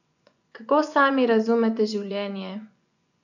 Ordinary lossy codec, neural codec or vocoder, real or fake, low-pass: none; vocoder, 24 kHz, 100 mel bands, Vocos; fake; 7.2 kHz